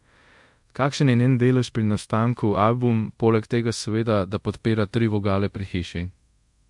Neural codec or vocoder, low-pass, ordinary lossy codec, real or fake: codec, 24 kHz, 0.5 kbps, DualCodec; 10.8 kHz; MP3, 64 kbps; fake